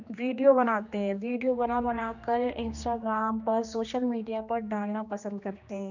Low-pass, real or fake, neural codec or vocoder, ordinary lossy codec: 7.2 kHz; fake; codec, 16 kHz, 2 kbps, X-Codec, HuBERT features, trained on general audio; none